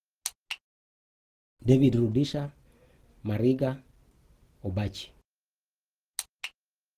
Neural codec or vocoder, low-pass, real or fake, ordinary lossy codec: none; 14.4 kHz; real; Opus, 16 kbps